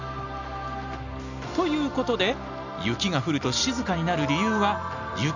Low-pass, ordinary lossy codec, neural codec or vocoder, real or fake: 7.2 kHz; none; none; real